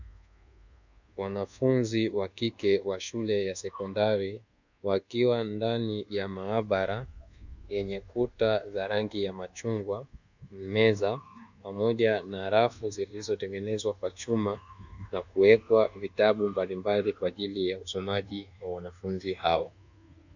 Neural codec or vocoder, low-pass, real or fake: codec, 24 kHz, 1.2 kbps, DualCodec; 7.2 kHz; fake